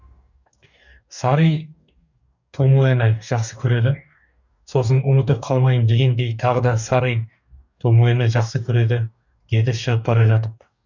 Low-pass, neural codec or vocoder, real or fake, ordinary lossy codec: 7.2 kHz; codec, 44.1 kHz, 2.6 kbps, DAC; fake; none